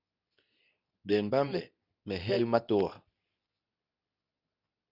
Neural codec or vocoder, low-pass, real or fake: codec, 24 kHz, 0.9 kbps, WavTokenizer, medium speech release version 2; 5.4 kHz; fake